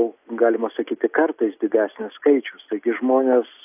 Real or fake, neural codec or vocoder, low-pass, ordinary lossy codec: real; none; 3.6 kHz; AAC, 32 kbps